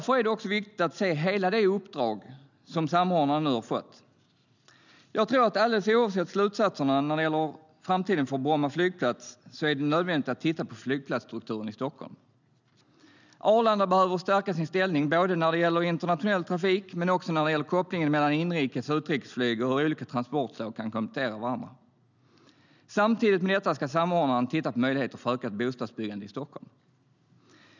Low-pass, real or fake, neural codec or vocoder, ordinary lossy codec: 7.2 kHz; real; none; none